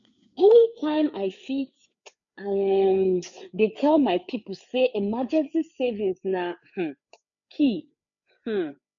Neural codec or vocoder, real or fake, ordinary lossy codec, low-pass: codec, 16 kHz, 4 kbps, FreqCodec, larger model; fake; none; 7.2 kHz